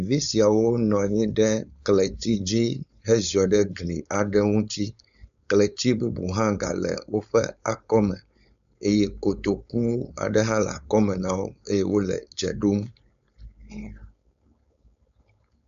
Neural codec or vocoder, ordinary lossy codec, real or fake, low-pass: codec, 16 kHz, 4.8 kbps, FACodec; AAC, 96 kbps; fake; 7.2 kHz